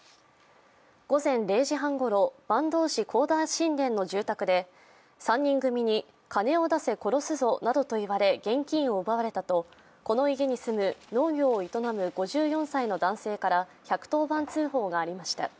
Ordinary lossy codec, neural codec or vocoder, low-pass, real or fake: none; none; none; real